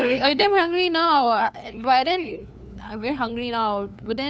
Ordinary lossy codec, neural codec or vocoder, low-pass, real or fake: none; codec, 16 kHz, 2 kbps, FunCodec, trained on LibriTTS, 25 frames a second; none; fake